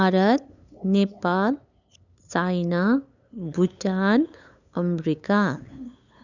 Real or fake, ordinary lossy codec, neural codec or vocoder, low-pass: fake; none; codec, 16 kHz, 8 kbps, FunCodec, trained on LibriTTS, 25 frames a second; 7.2 kHz